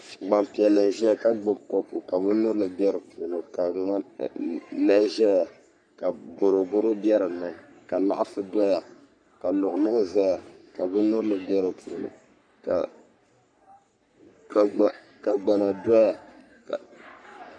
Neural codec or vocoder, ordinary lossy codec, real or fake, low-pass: codec, 44.1 kHz, 3.4 kbps, Pupu-Codec; MP3, 96 kbps; fake; 9.9 kHz